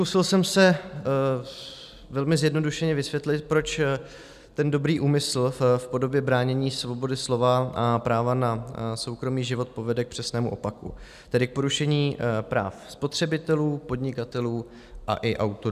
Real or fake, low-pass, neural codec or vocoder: real; 14.4 kHz; none